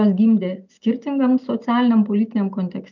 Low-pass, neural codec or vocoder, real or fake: 7.2 kHz; none; real